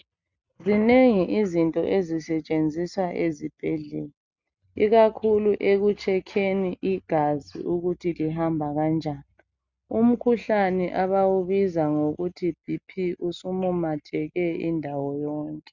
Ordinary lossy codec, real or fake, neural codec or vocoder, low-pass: Opus, 64 kbps; real; none; 7.2 kHz